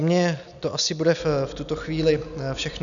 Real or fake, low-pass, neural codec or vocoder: real; 7.2 kHz; none